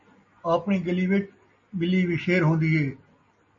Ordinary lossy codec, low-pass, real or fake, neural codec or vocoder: MP3, 32 kbps; 7.2 kHz; real; none